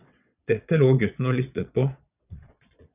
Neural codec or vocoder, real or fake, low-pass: vocoder, 22.05 kHz, 80 mel bands, Vocos; fake; 3.6 kHz